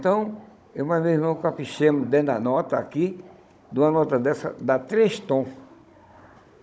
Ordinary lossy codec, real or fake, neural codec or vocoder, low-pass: none; fake; codec, 16 kHz, 16 kbps, FunCodec, trained on Chinese and English, 50 frames a second; none